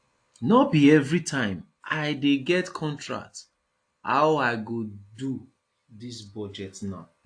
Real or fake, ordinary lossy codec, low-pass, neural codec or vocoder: real; AAC, 48 kbps; 9.9 kHz; none